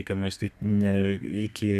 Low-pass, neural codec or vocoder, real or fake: 14.4 kHz; codec, 32 kHz, 1.9 kbps, SNAC; fake